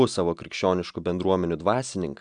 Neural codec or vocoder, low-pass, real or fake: none; 9.9 kHz; real